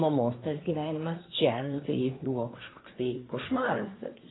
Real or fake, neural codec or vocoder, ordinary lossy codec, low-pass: fake; codec, 24 kHz, 1 kbps, SNAC; AAC, 16 kbps; 7.2 kHz